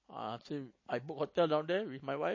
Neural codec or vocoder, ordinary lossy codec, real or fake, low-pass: none; MP3, 32 kbps; real; 7.2 kHz